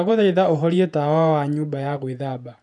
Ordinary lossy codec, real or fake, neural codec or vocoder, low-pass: none; fake; vocoder, 48 kHz, 128 mel bands, Vocos; 10.8 kHz